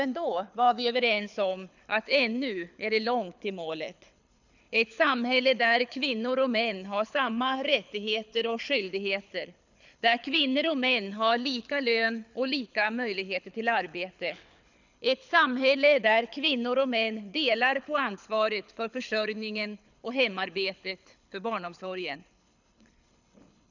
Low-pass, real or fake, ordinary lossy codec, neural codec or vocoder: 7.2 kHz; fake; none; codec, 24 kHz, 6 kbps, HILCodec